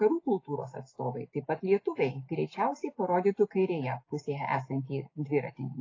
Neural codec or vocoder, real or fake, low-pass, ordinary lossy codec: none; real; 7.2 kHz; AAC, 32 kbps